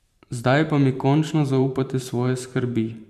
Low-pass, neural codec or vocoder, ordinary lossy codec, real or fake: 14.4 kHz; vocoder, 44.1 kHz, 128 mel bands every 512 samples, BigVGAN v2; MP3, 96 kbps; fake